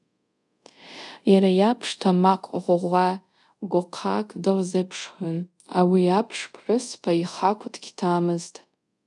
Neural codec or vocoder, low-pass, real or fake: codec, 24 kHz, 0.5 kbps, DualCodec; 10.8 kHz; fake